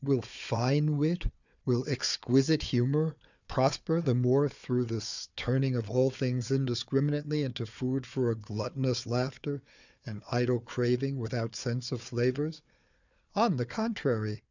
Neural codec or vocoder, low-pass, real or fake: codec, 16 kHz, 4 kbps, FunCodec, trained on Chinese and English, 50 frames a second; 7.2 kHz; fake